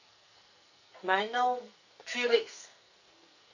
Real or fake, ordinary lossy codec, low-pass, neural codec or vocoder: fake; none; 7.2 kHz; codec, 44.1 kHz, 2.6 kbps, SNAC